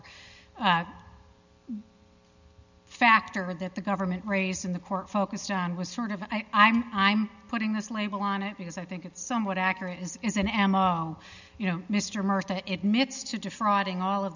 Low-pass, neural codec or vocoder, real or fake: 7.2 kHz; none; real